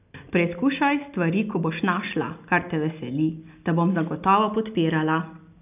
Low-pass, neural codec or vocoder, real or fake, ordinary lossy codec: 3.6 kHz; none; real; none